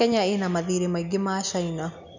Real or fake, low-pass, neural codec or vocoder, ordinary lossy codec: real; 7.2 kHz; none; none